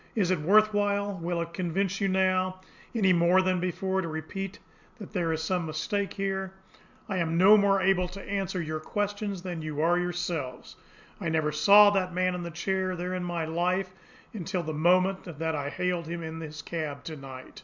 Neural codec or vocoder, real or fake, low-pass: none; real; 7.2 kHz